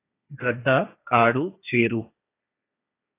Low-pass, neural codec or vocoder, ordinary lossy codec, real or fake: 3.6 kHz; codec, 16 kHz, 1.1 kbps, Voila-Tokenizer; AAC, 24 kbps; fake